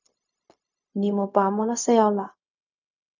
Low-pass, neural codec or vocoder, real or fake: 7.2 kHz; codec, 16 kHz, 0.4 kbps, LongCat-Audio-Codec; fake